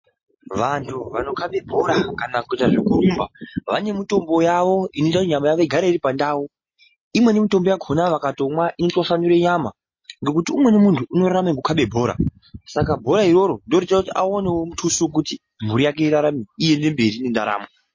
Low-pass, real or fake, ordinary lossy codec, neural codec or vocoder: 7.2 kHz; real; MP3, 32 kbps; none